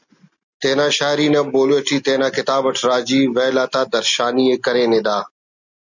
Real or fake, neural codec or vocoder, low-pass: real; none; 7.2 kHz